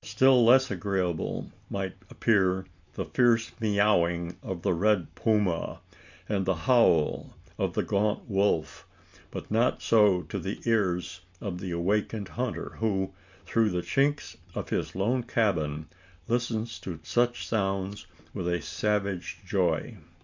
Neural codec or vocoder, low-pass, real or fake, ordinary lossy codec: none; 7.2 kHz; real; MP3, 64 kbps